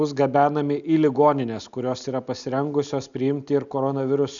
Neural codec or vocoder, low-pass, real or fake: none; 7.2 kHz; real